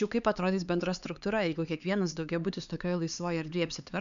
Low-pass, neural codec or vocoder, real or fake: 7.2 kHz; codec, 16 kHz, 4 kbps, X-Codec, HuBERT features, trained on LibriSpeech; fake